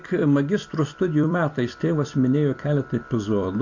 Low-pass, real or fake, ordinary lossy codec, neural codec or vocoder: 7.2 kHz; fake; AAC, 48 kbps; vocoder, 44.1 kHz, 128 mel bands every 256 samples, BigVGAN v2